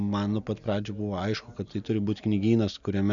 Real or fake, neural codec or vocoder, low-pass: real; none; 7.2 kHz